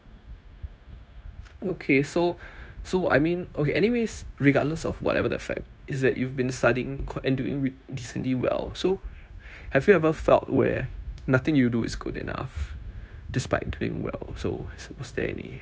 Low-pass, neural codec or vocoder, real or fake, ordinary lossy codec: none; codec, 16 kHz, 0.9 kbps, LongCat-Audio-Codec; fake; none